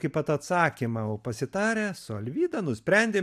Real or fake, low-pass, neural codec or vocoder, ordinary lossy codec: real; 14.4 kHz; none; AAC, 96 kbps